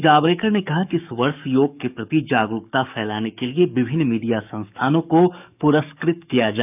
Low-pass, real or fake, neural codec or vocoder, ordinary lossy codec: 3.6 kHz; fake; codec, 44.1 kHz, 7.8 kbps, DAC; none